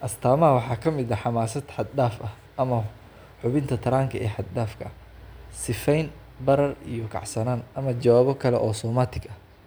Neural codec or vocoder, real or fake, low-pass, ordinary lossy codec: none; real; none; none